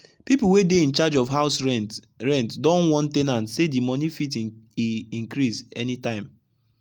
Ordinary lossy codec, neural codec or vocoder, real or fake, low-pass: Opus, 32 kbps; none; real; 19.8 kHz